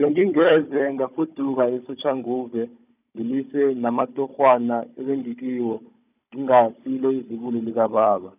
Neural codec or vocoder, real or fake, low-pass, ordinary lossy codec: codec, 16 kHz, 16 kbps, FunCodec, trained on Chinese and English, 50 frames a second; fake; 3.6 kHz; none